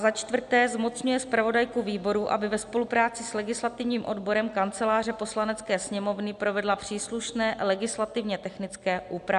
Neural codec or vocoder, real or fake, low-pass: none; real; 10.8 kHz